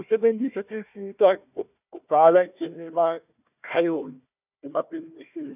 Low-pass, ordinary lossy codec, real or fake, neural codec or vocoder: 3.6 kHz; none; fake; codec, 16 kHz, 1 kbps, FunCodec, trained on Chinese and English, 50 frames a second